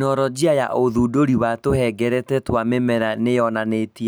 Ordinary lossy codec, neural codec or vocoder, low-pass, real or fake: none; none; none; real